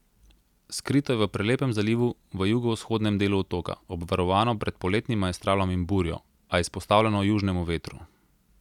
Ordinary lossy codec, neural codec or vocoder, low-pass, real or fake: none; none; 19.8 kHz; real